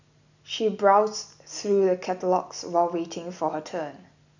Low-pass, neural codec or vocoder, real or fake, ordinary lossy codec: 7.2 kHz; none; real; none